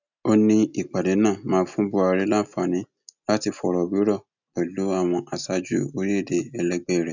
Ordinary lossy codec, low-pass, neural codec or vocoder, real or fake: none; 7.2 kHz; none; real